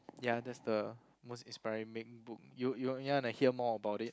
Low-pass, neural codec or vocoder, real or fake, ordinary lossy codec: none; none; real; none